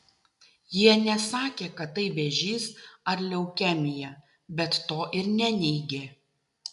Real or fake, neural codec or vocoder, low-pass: real; none; 10.8 kHz